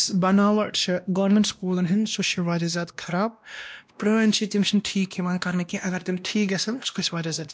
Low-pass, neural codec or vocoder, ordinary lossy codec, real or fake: none; codec, 16 kHz, 1 kbps, X-Codec, WavLM features, trained on Multilingual LibriSpeech; none; fake